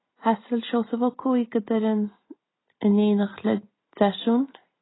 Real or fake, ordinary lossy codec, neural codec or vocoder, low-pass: real; AAC, 16 kbps; none; 7.2 kHz